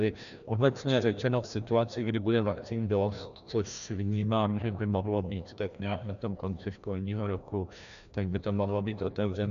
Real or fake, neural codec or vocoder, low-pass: fake; codec, 16 kHz, 1 kbps, FreqCodec, larger model; 7.2 kHz